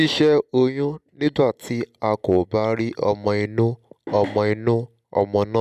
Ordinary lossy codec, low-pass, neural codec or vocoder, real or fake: none; 14.4 kHz; none; real